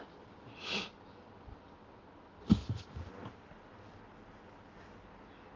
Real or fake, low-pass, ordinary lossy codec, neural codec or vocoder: real; 7.2 kHz; Opus, 24 kbps; none